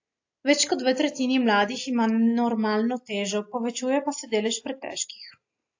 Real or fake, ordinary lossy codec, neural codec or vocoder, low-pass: real; AAC, 48 kbps; none; 7.2 kHz